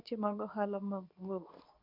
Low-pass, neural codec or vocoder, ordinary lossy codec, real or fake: 5.4 kHz; codec, 24 kHz, 0.9 kbps, WavTokenizer, medium speech release version 2; none; fake